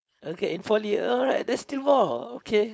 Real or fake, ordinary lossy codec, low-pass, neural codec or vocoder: fake; none; none; codec, 16 kHz, 4.8 kbps, FACodec